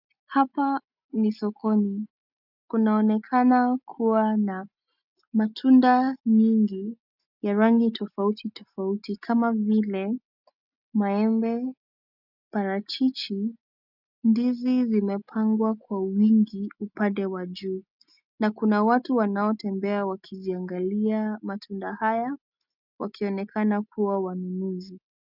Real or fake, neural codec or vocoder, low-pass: real; none; 5.4 kHz